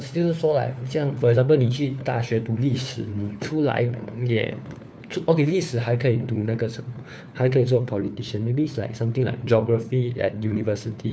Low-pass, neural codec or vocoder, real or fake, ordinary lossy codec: none; codec, 16 kHz, 4 kbps, FunCodec, trained on LibriTTS, 50 frames a second; fake; none